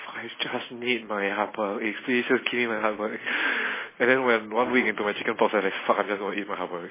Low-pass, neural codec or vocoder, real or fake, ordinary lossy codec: 3.6 kHz; none; real; MP3, 16 kbps